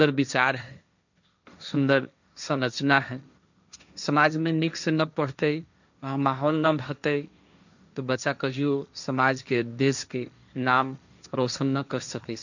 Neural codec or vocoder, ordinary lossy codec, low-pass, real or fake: codec, 16 kHz, 1.1 kbps, Voila-Tokenizer; none; 7.2 kHz; fake